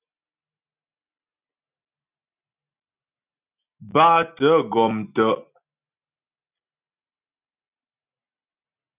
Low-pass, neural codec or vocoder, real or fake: 3.6 kHz; none; real